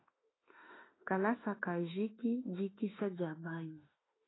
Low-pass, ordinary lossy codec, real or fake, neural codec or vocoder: 7.2 kHz; AAC, 16 kbps; fake; autoencoder, 48 kHz, 32 numbers a frame, DAC-VAE, trained on Japanese speech